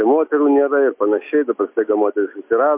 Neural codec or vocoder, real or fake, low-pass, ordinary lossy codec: none; real; 3.6 kHz; MP3, 32 kbps